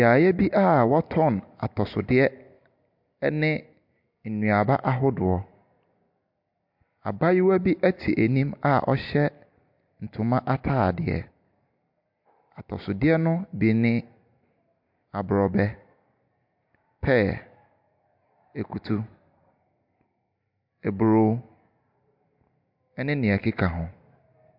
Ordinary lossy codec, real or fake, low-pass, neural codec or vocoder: MP3, 48 kbps; real; 5.4 kHz; none